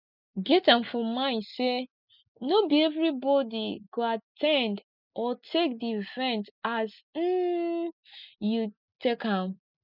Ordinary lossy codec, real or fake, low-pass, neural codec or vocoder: none; real; 5.4 kHz; none